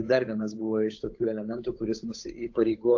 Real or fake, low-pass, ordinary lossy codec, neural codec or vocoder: fake; 7.2 kHz; AAC, 48 kbps; codec, 16 kHz, 8 kbps, FunCodec, trained on Chinese and English, 25 frames a second